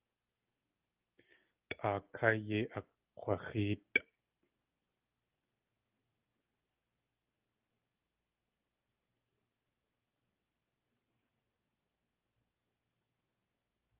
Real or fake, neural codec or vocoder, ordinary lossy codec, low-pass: real; none; Opus, 32 kbps; 3.6 kHz